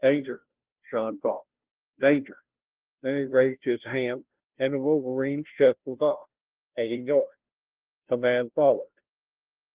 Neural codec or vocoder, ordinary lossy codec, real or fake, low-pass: codec, 16 kHz, 0.5 kbps, FunCodec, trained on Chinese and English, 25 frames a second; Opus, 16 kbps; fake; 3.6 kHz